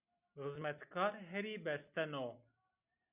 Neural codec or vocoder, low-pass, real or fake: none; 3.6 kHz; real